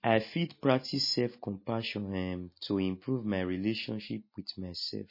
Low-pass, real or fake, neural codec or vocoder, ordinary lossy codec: 5.4 kHz; real; none; MP3, 24 kbps